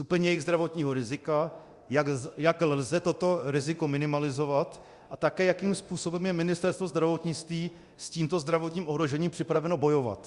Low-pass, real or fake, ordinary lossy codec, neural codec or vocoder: 10.8 kHz; fake; Opus, 64 kbps; codec, 24 kHz, 0.9 kbps, DualCodec